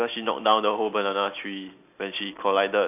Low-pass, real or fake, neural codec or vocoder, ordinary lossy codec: 3.6 kHz; real; none; AAC, 32 kbps